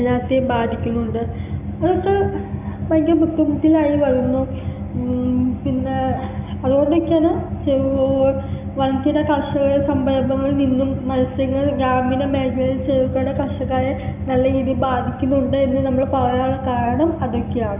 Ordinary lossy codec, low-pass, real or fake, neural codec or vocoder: none; 3.6 kHz; real; none